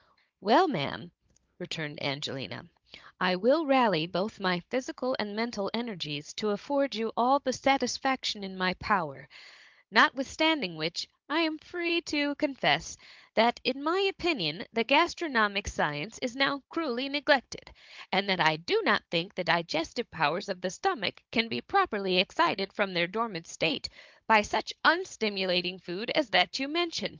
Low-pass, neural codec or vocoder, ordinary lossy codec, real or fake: 7.2 kHz; codec, 16 kHz, 16 kbps, FunCodec, trained on Chinese and English, 50 frames a second; Opus, 24 kbps; fake